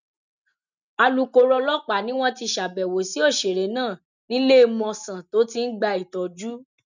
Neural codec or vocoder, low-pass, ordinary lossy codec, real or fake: none; 7.2 kHz; none; real